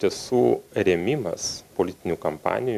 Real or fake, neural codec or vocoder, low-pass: real; none; 14.4 kHz